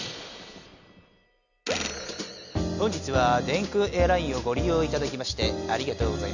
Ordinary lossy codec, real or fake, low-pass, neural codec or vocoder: none; real; 7.2 kHz; none